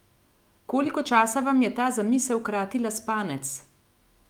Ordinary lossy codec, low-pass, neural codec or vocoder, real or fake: Opus, 32 kbps; 19.8 kHz; autoencoder, 48 kHz, 128 numbers a frame, DAC-VAE, trained on Japanese speech; fake